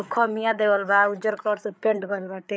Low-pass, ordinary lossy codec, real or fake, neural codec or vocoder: none; none; fake; codec, 16 kHz, 8 kbps, FreqCodec, larger model